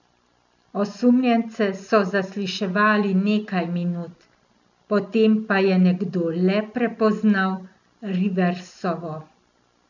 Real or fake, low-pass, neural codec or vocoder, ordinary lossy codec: real; 7.2 kHz; none; none